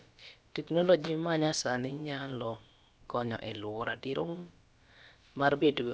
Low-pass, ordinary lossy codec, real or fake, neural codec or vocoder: none; none; fake; codec, 16 kHz, about 1 kbps, DyCAST, with the encoder's durations